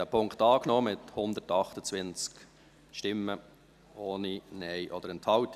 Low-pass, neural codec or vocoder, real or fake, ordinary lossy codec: 14.4 kHz; vocoder, 44.1 kHz, 128 mel bands every 256 samples, BigVGAN v2; fake; none